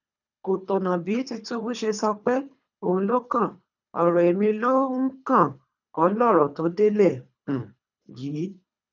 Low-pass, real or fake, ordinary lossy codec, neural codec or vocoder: 7.2 kHz; fake; none; codec, 24 kHz, 3 kbps, HILCodec